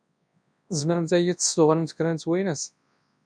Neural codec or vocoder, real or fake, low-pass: codec, 24 kHz, 0.9 kbps, WavTokenizer, large speech release; fake; 9.9 kHz